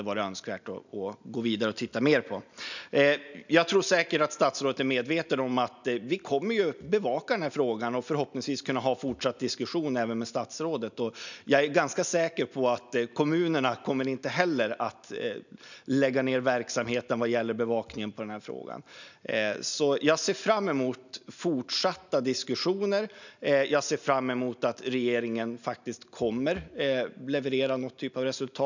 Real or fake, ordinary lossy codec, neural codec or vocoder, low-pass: real; none; none; 7.2 kHz